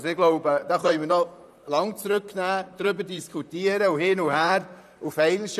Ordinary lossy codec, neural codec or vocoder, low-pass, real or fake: none; vocoder, 44.1 kHz, 128 mel bands, Pupu-Vocoder; 14.4 kHz; fake